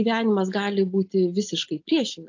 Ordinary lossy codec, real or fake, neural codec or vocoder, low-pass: AAC, 48 kbps; real; none; 7.2 kHz